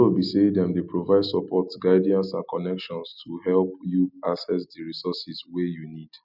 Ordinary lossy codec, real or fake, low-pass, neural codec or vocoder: none; real; 5.4 kHz; none